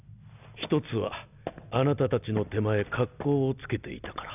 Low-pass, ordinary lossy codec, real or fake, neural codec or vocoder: 3.6 kHz; none; real; none